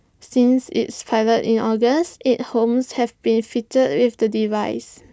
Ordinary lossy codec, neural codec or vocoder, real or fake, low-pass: none; none; real; none